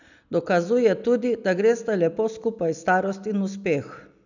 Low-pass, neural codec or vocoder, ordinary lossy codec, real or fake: 7.2 kHz; none; none; real